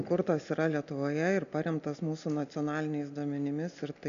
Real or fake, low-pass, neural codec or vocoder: real; 7.2 kHz; none